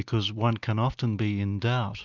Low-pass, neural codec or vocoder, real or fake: 7.2 kHz; none; real